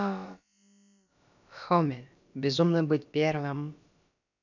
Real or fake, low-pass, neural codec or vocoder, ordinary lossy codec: fake; 7.2 kHz; codec, 16 kHz, about 1 kbps, DyCAST, with the encoder's durations; none